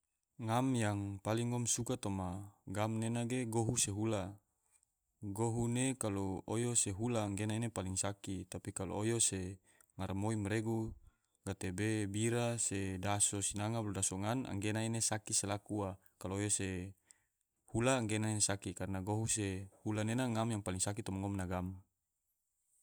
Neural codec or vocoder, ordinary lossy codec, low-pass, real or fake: none; none; none; real